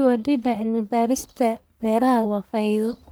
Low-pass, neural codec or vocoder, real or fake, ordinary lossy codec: none; codec, 44.1 kHz, 1.7 kbps, Pupu-Codec; fake; none